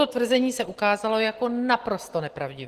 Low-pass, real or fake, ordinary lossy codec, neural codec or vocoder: 14.4 kHz; real; Opus, 16 kbps; none